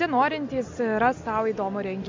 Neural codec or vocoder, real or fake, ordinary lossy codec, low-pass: none; real; MP3, 64 kbps; 7.2 kHz